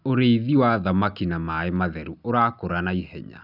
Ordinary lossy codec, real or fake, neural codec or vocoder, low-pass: none; real; none; 5.4 kHz